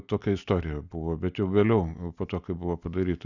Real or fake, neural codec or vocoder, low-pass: real; none; 7.2 kHz